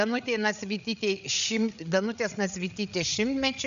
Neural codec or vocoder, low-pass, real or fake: codec, 16 kHz, 8 kbps, FreqCodec, larger model; 7.2 kHz; fake